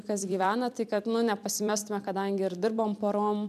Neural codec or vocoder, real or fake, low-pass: vocoder, 44.1 kHz, 128 mel bands every 256 samples, BigVGAN v2; fake; 14.4 kHz